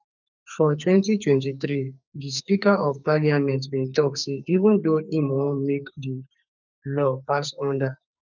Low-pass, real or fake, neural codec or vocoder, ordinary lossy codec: 7.2 kHz; fake; codec, 44.1 kHz, 2.6 kbps, SNAC; none